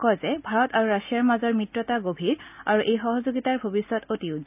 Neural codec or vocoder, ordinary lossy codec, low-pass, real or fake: none; none; 3.6 kHz; real